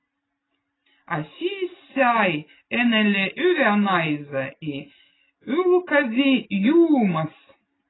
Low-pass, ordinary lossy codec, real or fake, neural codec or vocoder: 7.2 kHz; AAC, 16 kbps; real; none